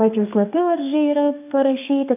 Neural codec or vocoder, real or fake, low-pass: codec, 32 kHz, 1.9 kbps, SNAC; fake; 3.6 kHz